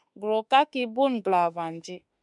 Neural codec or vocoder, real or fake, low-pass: autoencoder, 48 kHz, 32 numbers a frame, DAC-VAE, trained on Japanese speech; fake; 10.8 kHz